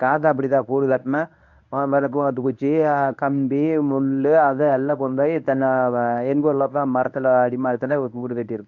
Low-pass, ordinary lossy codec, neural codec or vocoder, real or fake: 7.2 kHz; none; codec, 24 kHz, 0.9 kbps, WavTokenizer, medium speech release version 1; fake